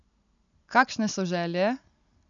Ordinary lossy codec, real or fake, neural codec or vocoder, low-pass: none; real; none; 7.2 kHz